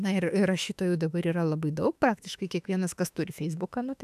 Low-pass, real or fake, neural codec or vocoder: 14.4 kHz; fake; autoencoder, 48 kHz, 32 numbers a frame, DAC-VAE, trained on Japanese speech